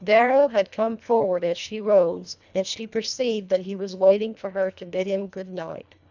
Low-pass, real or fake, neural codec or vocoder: 7.2 kHz; fake; codec, 24 kHz, 1.5 kbps, HILCodec